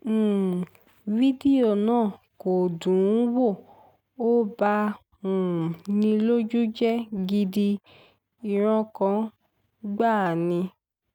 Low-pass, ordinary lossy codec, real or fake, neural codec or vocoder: 19.8 kHz; none; real; none